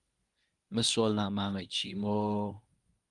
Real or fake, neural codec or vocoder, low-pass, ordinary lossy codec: fake; codec, 24 kHz, 0.9 kbps, WavTokenizer, medium speech release version 1; 10.8 kHz; Opus, 32 kbps